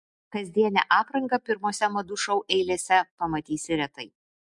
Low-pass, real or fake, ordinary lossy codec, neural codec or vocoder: 10.8 kHz; real; MP3, 64 kbps; none